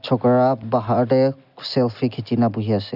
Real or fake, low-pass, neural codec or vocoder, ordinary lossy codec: real; 5.4 kHz; none; none